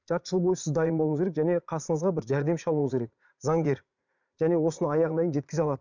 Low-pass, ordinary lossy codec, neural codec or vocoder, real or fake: 7.2 kHz; none; vocoder, 44.1 kHz, 80 mel bands, Vocos; fake